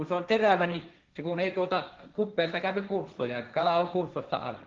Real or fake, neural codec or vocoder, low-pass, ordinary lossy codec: fake; codec, 16 kHz, 1.1 kbps, Voila-Tokenizer; 7.2 kHz; Opus, 32 kbps